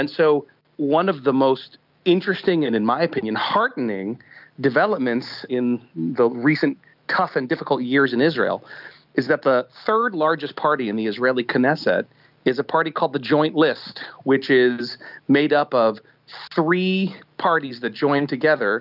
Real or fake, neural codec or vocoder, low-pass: real; none; 5.4 kHz